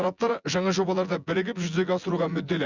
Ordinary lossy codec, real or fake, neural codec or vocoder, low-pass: Opus, 64 kbps; fake; vocoder, 24 kHz, 100 mel bands, Vocos; 7.2 kHz